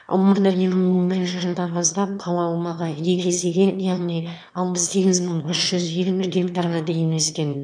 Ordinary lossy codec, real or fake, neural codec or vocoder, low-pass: none; fake; autoencoder, 22.05 kHz, a latent of 192 numbers a frame, VITS, trained on one speaker; 9.9 kHz